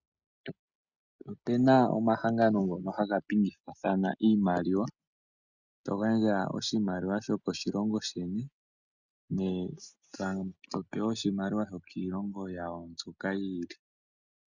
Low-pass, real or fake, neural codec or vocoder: 7.2 kHz; real; none